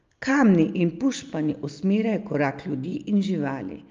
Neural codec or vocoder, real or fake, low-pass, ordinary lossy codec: none; real; 7.2 kHz; Opus, 32 kbps